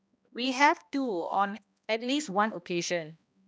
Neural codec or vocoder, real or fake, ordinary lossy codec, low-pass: codec, 16 kHz, 1 kbps, X-Codec, HuBERT features, trained on balanced general audio; fake; none; none